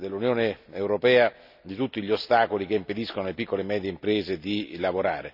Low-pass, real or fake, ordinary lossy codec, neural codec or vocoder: 5.4 kHz; real; none; none